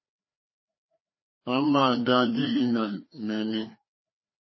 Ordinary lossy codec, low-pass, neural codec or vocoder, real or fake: MP3, 24 kbps; 7.2 kHz; codec, 16 kHz, 2 kbps, FreqCodec, larger model; fake